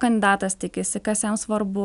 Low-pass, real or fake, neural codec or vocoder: 10.8 kHz; real; none